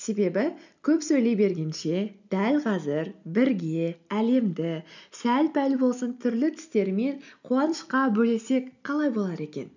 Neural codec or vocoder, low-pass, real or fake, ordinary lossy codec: none; 7.2 kHz; real; none